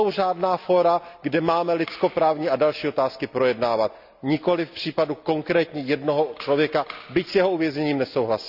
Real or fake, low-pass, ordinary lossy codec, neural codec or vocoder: real; 5.4 kHz; none; none